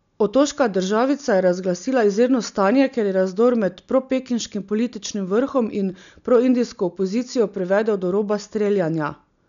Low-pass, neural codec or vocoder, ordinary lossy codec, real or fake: 7.2 kHz; none; none; real